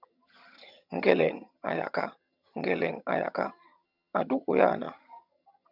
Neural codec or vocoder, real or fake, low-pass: vocoder, 22.05 kHz, 80 mel bands, HiFi-GAN; fake; 5.4 kHz